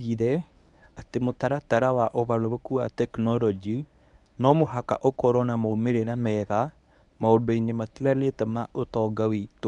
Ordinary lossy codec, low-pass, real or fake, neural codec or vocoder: none; 10.8 kHz; fake; codec, 24 kHz, 0.9 kbps, WavTokenizer, medium speech release version 1